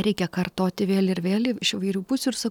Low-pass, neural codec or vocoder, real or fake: 19.8 kHz; none; real